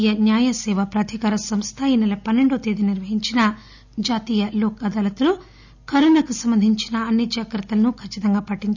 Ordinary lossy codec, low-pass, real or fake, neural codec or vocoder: none; 7.2 kHz; real; none